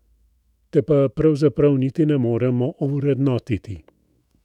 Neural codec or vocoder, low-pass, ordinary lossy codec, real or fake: autoencoder, 48 kHz, 128 numbers a frame, DAC-VAE, trained on Japanese speech; 19.8 kHz; none; fake